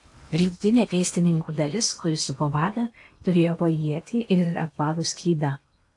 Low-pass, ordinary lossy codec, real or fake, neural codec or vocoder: 10.8 kHz; AAC, 64 kbps; fake; codec, 16 kHz in and 24 kHz out, 0.8 kbps, FocalCodec, streaming, 65536 codes